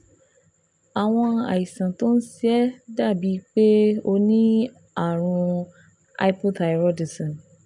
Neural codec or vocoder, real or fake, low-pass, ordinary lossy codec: none; real; 10.8 kHz; none